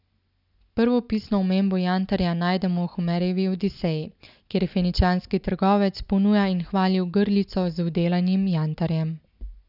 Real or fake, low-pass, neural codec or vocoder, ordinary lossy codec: real; 5.4 kHz; none; none